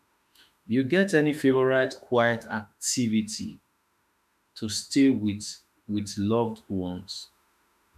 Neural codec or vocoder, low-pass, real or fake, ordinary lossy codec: autoencoder, 48 kHz, 32 numbers a frame, DAC-VAE, trained on Japanese speech; 14.4 kHz; fake; none